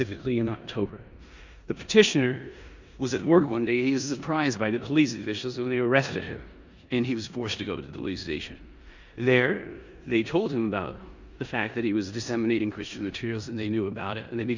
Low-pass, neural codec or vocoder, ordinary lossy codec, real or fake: 7.2 kHz; codec, 16 kHz in and 24 kHz out, 0.9 kbps, LongCat-Audio-Codec, four codebook decoder; Opus, 64 kbps; fake